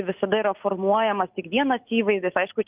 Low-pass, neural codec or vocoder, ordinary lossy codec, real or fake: 3.6 kHz; none; Opus, 24 kbps; real